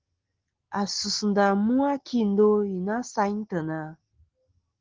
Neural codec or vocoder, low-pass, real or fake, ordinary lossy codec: none; 7.2 kHz; real; Opus, 16 kbps